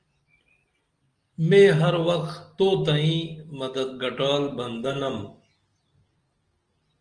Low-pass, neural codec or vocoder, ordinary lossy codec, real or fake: 9.9 kHz; none; Opus, 24 kbps; real